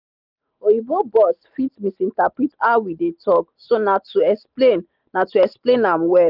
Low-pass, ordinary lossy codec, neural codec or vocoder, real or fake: 5.4 kHz; none; none; real